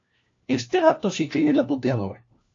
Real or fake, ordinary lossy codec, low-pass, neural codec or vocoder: fake; AAC, 48 kbps; 7.2 kHz; codec, 16 kHz, 1 kbps, FunCodec, trained on LibriTTS, 50 frames a second